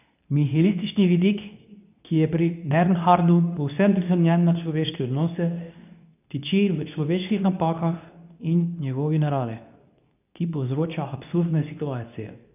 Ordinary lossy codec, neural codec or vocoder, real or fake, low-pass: none; codec, 24 kHz, 0.9 kbps, WavTokenizer, medium speech release version 2; fake; 3.6 kHz